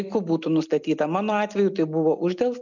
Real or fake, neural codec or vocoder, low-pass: real; none; 7.2 kHz